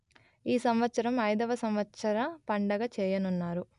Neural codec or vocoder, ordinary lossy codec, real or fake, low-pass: none; none; real; 10.8 kHz